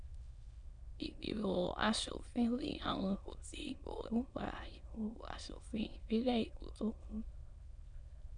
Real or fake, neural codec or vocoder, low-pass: fake; autoencoder, 22.05 kHz, a latent of 192 numbers a frame, VITS, trained on many speakers; 9.9 kHz